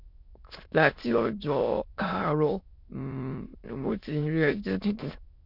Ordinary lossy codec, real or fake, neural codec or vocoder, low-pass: none; fake; autoencoder, 22.05 kHz, a latent of 192 numbers a frame, VITS, trained on many speakers; 5.4 kHz